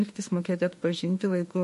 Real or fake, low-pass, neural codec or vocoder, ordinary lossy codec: fake; 14.4 kHz; autoencoder, 48 kHz, 32 numbers a frame, DAC-VAE, trained on Japanese speech; MP3, 48 kbps